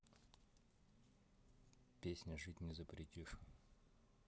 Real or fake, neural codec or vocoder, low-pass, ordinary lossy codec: real; none; none; none